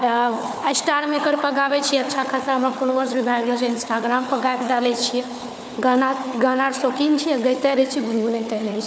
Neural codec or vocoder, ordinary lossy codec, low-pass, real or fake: codec, 16 kHz, 4 kbps, FunCodec, trained on Chinese and English, 50 frames a second; none; none; fake